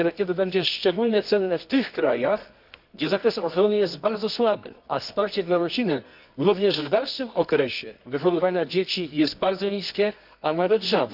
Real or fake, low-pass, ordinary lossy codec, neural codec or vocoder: fake; 5.4 kHz; none; codec, 24 kHz, 0.9 kbps, WavTokenizer, medium music audio release